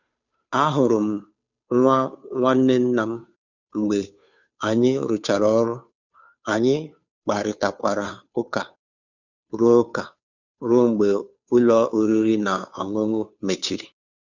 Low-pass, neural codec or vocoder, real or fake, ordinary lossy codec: 7.2 kHz; codec, 16 kHz, 2 kbps, FunCodec, trained on Chinese and English, 25 frames a second; fake; none